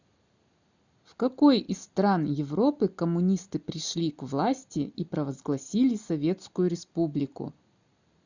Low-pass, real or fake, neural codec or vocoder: 7.2 kHz; real; none